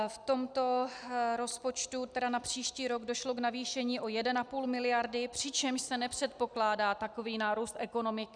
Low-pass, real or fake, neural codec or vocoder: 9.9 kHz; real; none